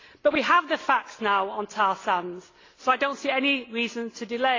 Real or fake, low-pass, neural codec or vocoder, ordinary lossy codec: real; 7.2 kHz; none; AAC, 32 kbps